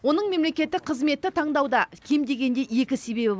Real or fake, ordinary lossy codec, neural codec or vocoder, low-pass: real; none; none; none